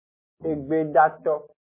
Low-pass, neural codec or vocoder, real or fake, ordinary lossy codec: 3.6 kHz; none; real; MP3, 16 kbps